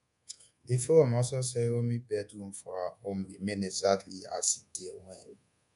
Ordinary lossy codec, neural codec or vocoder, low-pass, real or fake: none; codec, 24 kHz, 1.2 kbps, DualCodec; 10.8 kHz; fake